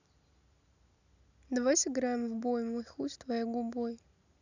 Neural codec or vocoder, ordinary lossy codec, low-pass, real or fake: none; none; 7.2 kHz; real